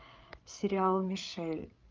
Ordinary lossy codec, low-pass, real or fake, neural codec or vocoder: Opus, 32 kbps; 7.2 kHz; fake; codec, 16 kHz, 8 kbps, FreqCodec, larger model